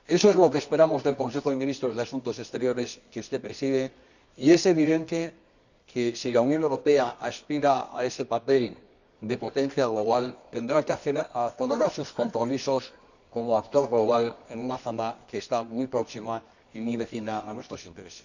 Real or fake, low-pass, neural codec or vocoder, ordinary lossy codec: fake; 7.2 kHz; codec, 24 kHz, 0.9 kbps, WavTokenizer, medium music audio release; none